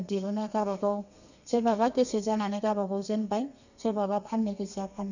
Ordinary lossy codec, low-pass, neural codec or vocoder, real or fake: none; 7.2 kHz; codec, 32 kHz, 1.9 kbps, SNAC; fake